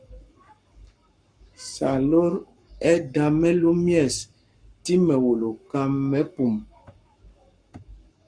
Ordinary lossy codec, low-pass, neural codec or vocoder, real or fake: Opus, 64 kbps; 9.9 kHz; codec, 44.1 kHz, 7.8 kbps, Pupu-Codec; fake